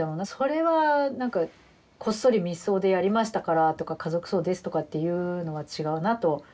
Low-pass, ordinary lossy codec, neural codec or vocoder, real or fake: none; none; none; real